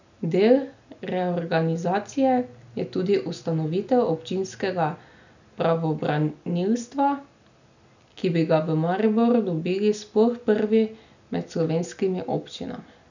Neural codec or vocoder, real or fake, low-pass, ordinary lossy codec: none; real; 7.2 kHz; none